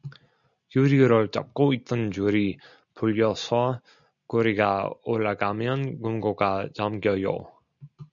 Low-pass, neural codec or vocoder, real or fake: 7.2 kHz; none; real